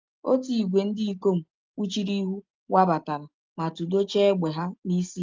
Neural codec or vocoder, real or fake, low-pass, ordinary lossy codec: none; real; 7.2 kHz; Opus, 32 kbps